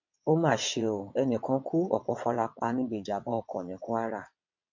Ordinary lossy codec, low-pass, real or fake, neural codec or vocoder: MP3, 48 kbps; 7.2 kHz; fake; vocoder, 22.05 kHz, 80 mel bands, WaveNeXt